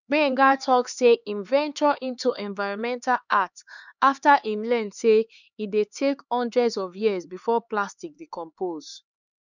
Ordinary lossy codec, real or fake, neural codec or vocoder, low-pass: none; fake; codec, 16 kHz, 4 kbps, X-Codec, HuBERT features, trained on LibriSpeech; 7.2 kHz